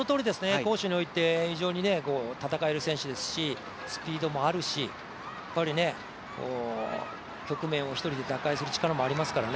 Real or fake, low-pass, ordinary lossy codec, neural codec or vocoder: real; none; none; none